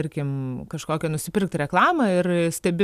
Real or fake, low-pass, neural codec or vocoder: real; 14.4 kHz; none